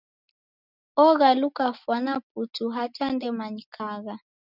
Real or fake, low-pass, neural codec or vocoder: real; 5.4 kHz; none